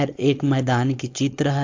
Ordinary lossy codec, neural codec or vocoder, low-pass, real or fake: AAC, 48 kbps; codec, 16 kHz, 4.8 kbps, FACodec; 7.2 kHz; fake